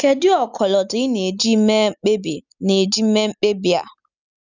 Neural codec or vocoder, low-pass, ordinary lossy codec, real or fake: none; 7.2 kHz; none; real